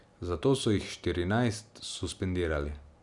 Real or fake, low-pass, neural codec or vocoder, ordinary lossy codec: real; 10.8 kHz; none; none